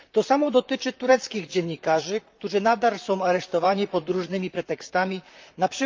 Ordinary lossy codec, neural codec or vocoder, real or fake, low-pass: Opus, 24 kbps; vocoder, 44.1 kHz, 128 mel bands, Pupu-Vocoder; fake; 7.2 kHz